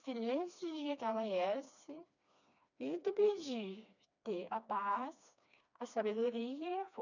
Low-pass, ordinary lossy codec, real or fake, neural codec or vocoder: 7.2 kHz; none; fake; codec, 16 kHz, 2 kbps, FreqCodec, smaller model